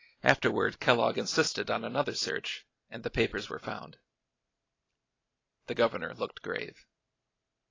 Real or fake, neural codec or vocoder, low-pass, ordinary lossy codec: fake; vocoder, 44.1 kHz, 128 mel bands every 512 samples, BigVGAN v2; 7.2 kHz; AAC, 32 kbps